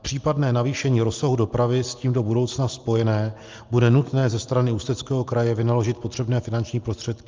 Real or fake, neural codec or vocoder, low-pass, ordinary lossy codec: real; none; 7.2 kHz; Opus, 32 kbps